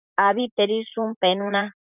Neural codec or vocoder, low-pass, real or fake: vocoder, 44.1 kHz, 128 mel bands, Pupu-Vocoder; 3.6 kHz; fake